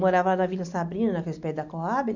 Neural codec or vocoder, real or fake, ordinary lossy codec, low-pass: none; real; none; 7.2 kHz